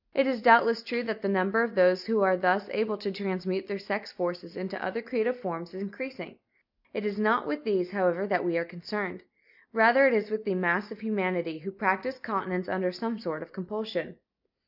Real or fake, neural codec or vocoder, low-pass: real; none; 5.4 kHz